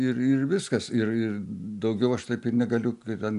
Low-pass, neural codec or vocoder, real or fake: 10.8 kHz; none; real